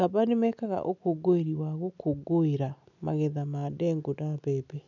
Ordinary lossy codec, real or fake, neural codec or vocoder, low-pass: none; real; none; 7.2 kHz